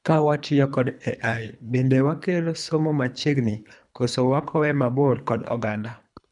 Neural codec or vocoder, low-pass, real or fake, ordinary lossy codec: codec, 24 kHz, 3 kbps, HILCodec; 10.8 kHz; fake; none